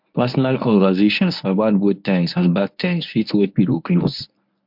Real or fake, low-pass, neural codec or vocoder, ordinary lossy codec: fake; 5.4 kHz; codec, 24 kHz, 0.9 kbps, WavTokenizer, medium speech release version 1; MP3, 48 kbps